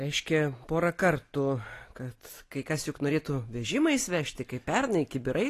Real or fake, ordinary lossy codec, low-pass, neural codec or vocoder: real; AAC, 48 kbps; 14.4 kHz; none